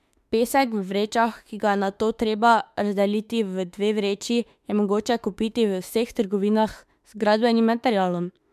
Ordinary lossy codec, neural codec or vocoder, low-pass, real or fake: MP3, 96 kbps; autoencoder, 48 kHz, 32 numbers a frame, DAC-VAE, trained on Japanese speech; 14.4 kHz; fake